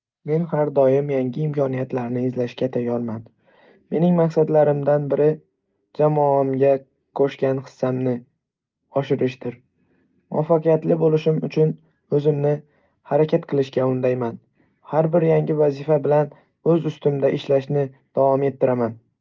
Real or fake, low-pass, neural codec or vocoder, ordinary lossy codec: real; 7.2 kHz; none; Opus, 32 kbps